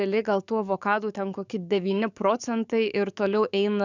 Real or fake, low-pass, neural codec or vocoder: fake; 7.2 kHz; codec, 44.1 kHz, 7.8 kbps, DAC